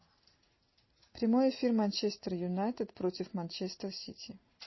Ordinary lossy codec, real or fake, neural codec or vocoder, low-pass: MP3, 24 kbps; real; none; 7.2 kHz